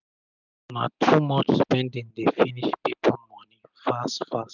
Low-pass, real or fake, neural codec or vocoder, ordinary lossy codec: 7.2 kHz; real; none; none